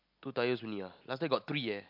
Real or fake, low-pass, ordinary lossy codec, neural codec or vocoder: real; 5.4 kHz; MP3, 48 kbps; none